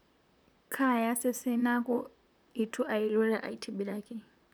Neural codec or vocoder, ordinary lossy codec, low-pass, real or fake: vocoder, 44.1 kHz, 128 mel bands, Pupu-Vocoder; none; none; fake